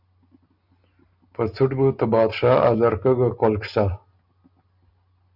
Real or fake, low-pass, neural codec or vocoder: real; 5.4 kHz; none